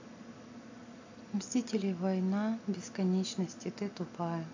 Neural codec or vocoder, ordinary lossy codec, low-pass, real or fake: none; AAC, 48 kbps; 7.2 kHz; real